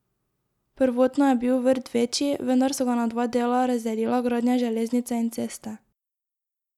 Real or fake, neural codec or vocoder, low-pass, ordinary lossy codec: real; none; 19.8 kHz; none